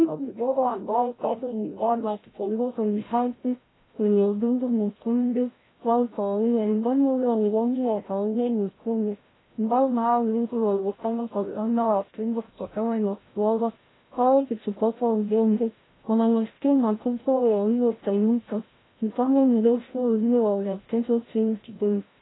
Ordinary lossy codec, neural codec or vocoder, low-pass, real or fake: AAC, 16 kbps; codec, 16 kHz, 0.5 kbps, FreqCodec, larger model; 7.2 kHz; fake